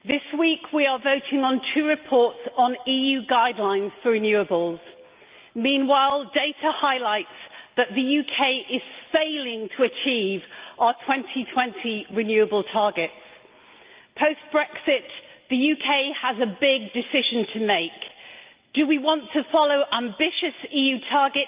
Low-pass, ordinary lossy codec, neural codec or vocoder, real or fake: 3.6 kHz; Opus, 32 kbps; none; real